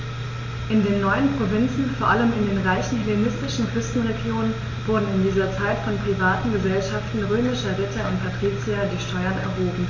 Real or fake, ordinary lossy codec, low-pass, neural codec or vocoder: real; MP3, 48 kbps; 7.2 kHz; none